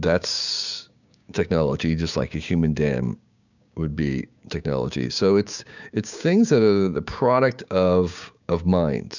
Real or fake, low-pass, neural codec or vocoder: fake; 7.2 kHz; codec, 16 kHz, 6 kbps, DAC